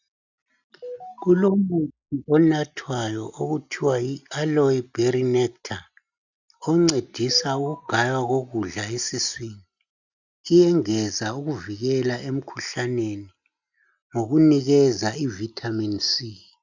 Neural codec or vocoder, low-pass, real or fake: none; 7.2 kHz; real